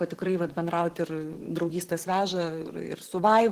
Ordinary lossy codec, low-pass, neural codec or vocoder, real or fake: Opus, 16 kbps; 14.4 kHz; vocoder, 44.1 kHz, 128 mel bands, Pupu-Vocoder; fake